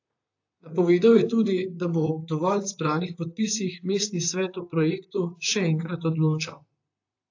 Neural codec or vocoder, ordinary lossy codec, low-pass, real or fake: vocoder, 44.1 kHz, 128 mel bands, Pupu-Vocoder; AAC, 48 kbps; 7.2 kHz; fake